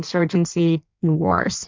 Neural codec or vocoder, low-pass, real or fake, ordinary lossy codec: codec, 16 kHz in and 24 kHz out, 1.1 kbps, FireRedTTS-2 codec; 7.2 kHz; fake; MP3, 64 kbps